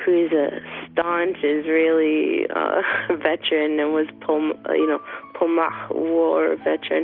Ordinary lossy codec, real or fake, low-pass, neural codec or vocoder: Opus, 32 kbps; real; 5.4 kHz; none